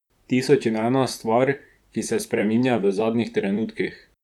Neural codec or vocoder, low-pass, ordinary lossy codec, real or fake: vocoder, 44.1 kHz, 128 mel bands, Pupu-Vocoder; 19.8 kHz; none; fake